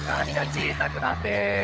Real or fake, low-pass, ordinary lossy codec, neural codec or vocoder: fake; none; none; codec, 16 kHz, 16 kbps, FunCodec, trained on LibriTTS, 50 frames a second